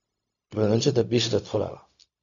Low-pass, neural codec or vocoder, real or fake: 7.2 kHz; codec, 16 kHz, 0.4 kbps, LongCat-Audio-Codec; fake